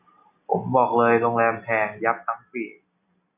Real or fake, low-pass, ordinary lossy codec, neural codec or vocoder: real; 3.6 kHz; AAC, 32 kbps; none